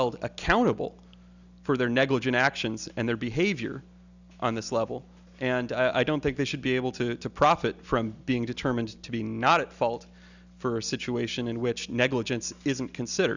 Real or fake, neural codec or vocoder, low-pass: real; none; 7.2 kHz